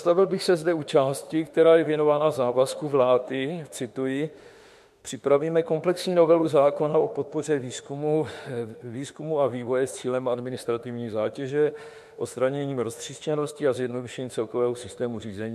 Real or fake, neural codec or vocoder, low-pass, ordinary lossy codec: fake; autoencoder, 48 kHz, 32 numbers a frame, DAC-VAE, trained on Japanese speech; 14.4 kHz; MP3, 64 kbps